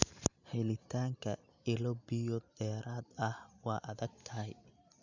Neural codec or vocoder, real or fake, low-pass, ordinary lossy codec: none; real; 7.2 kHz; none